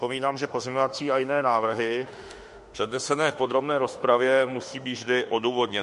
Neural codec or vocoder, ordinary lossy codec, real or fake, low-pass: autoencoder, 48 kHz, 32 numbers a frame, DAC-VAE, trained on Japanese speech; MP3, 48 kbps; fake; 14.4 kHz